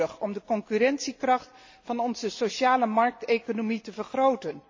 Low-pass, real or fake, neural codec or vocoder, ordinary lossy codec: 7.2 kHz; real; none; MP3, 32 kbps